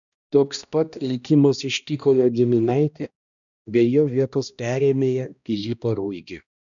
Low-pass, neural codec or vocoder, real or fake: 7.2 kHz; codec, 16 kHz, 1 kbps, X-Codec, HuBERT features, trained on balanced general audio; fake